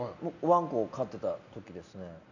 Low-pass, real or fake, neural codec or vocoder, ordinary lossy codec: 7.2 kHz; real; none; none